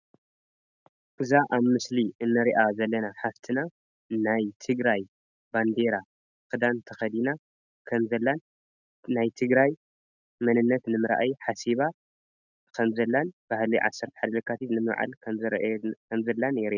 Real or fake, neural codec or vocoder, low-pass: real; none; 7.2 kHz